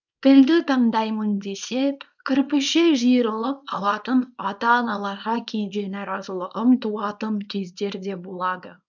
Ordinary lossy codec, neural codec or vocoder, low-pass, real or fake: none; codec, 24 kHz, 0.9 kbps, WavTokenizer, small release; 7.2 kHz; fake